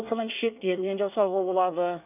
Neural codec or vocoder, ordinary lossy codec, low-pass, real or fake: codec, 24 kHz, 1 kbps, SNAC; none; 3.6 kHz; fake